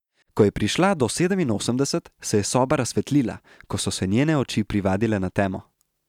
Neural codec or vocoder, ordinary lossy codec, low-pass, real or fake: none; none; 19.8 kHz; real